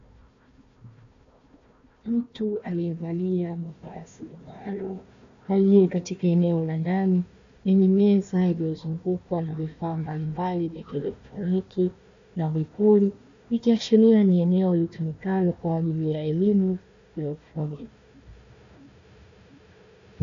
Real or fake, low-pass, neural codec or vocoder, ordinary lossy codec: fake; 7.2 kHz; codec, 16 kHz, 1 kbps, FunCodec, trained on Chinese and English, 50 frames a second; AAC, 64 kbps